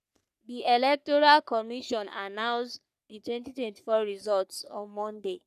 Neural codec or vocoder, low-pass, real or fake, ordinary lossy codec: codec, 44.1 kHz, 3.4 kbps, Pupu-Codec; 14.4 kHz; fake; none